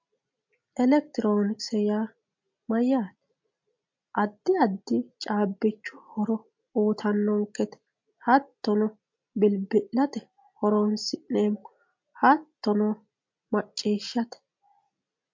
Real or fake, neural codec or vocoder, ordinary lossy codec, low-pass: real; none; MP3, 48 kbps; 7.2 kHz